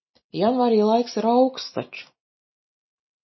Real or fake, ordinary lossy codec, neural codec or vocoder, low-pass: real; MP3, 24 kbps; none; 7.2 kHz